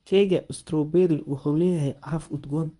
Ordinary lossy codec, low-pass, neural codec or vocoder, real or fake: none; 10.8 kHz; codec, 24 kHz, 0.9 kbps, WavTokenizer, medium speech release version 1; fake